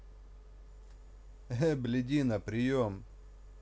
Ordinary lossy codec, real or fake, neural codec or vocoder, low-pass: none; real; none; none